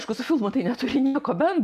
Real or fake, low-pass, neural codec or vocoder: real; 14.4 kHz; none